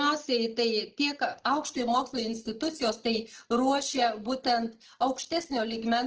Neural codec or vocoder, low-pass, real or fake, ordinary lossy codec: none; 7.2 kHz; real; Opus, 16 kbps